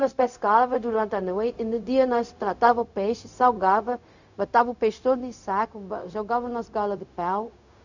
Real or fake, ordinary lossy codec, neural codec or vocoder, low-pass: fake; none; codec, 16 kHz, 0.4 kbps, LongCat-Audio-Codec; 7.2 kHz